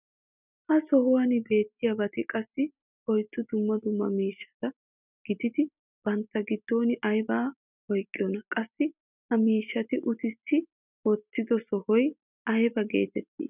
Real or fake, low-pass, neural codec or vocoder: real; 3.6 kHz; none